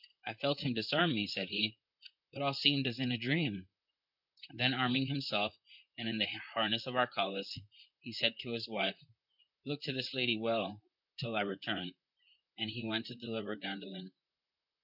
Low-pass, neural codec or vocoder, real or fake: 5.4 kHz; vocoder, 44.1 kHz, 128 mel bands, Pupu-Vocoder; fake